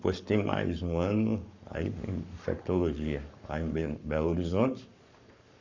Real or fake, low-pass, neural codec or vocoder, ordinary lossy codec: fake; 7.2 kHz; codec, 44.1 kHz, 7.8 kbps, Pupu-Codec; none